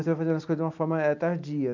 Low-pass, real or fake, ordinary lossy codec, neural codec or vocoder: 7.2 kHz; real; MP3, 64 kbps; none